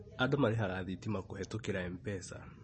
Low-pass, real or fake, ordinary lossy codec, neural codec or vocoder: 9.9 kHz; real; MP3, 32 kbps; none